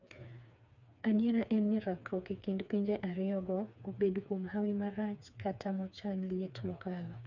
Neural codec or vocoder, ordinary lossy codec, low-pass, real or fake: codec, 16 kHz, 4 kbps, FreqCodec, smaller model; none; 7.2 kHz; fake